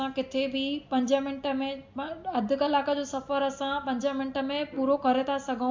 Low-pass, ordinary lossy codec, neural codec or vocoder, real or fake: 7.2 kHz; MP3, 48 kbps; none; real